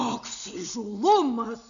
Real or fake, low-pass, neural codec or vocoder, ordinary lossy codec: real; 7.2 kHz; none; MP3, 96 kbps